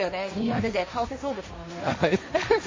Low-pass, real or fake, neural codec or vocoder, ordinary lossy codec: 7.2 kHz; fake; codec, 16 kHz, 1.1 kbps, Voila-Tokenizer; MP3, 32 kbps